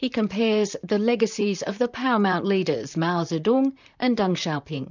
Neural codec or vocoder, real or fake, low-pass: vocoder, 44.1 kHz, 128 mel bands, Pupu-Vocoder; fake; 7.2 kHz